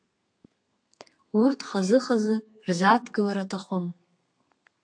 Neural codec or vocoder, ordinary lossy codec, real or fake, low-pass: codec, 32 kHz, 1.9 kbps, SNAC; AAC, 64 kbps; fake; 9.9 kHz